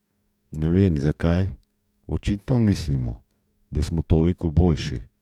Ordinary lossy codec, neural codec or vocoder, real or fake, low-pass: none; codec, 44.1 kHz, 2.6 kbps, DAC; fake; 19.8 kHz